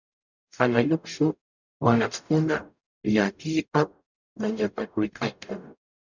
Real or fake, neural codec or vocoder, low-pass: fake; codec, 44.1 kHz, 0.9 kbps, DAC; 7.2 kHz